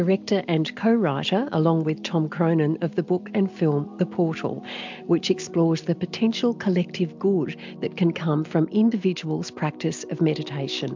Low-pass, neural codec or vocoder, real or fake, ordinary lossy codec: 7.2 kHz; none; real; MP3, 64 kbps